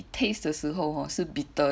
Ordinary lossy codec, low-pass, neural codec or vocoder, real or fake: none; none; none; real